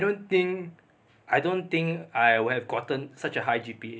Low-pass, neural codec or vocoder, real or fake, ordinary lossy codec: none; none; real; none